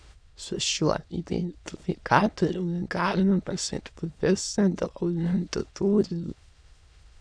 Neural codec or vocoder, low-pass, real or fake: autoencoder, 22.05 kHz, a latent of 192 numbers a frame, VITS, trained on many speakers; 9.9 kHz; fake